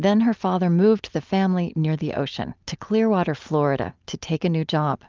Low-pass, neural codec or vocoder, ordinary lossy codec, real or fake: 7.2 kHz; none; Opus, 32 kbps; real